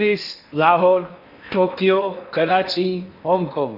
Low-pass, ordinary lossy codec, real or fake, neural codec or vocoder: 5.4 kHz; none; fake; codec, 16 kHz in and 24 kHz out, 0.8 kbps, FocalCodec, streaming, 65536 codes